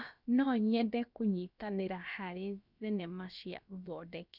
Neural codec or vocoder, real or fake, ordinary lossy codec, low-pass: codec, 16 kHz, about 1 kbps, DyCAST, with the encoder's durations; fake; none; 5.4 kHz